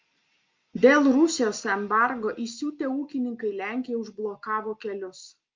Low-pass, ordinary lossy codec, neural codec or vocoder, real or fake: 7.2 kHz; Opus, 32 kbps; none; real